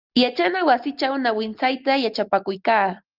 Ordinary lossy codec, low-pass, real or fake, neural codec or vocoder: Opus, 32 kbps; 5.4 kHz; real; none